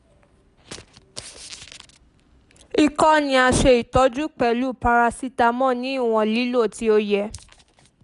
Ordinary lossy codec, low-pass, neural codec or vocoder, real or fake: none; 10.8 kHz; none; real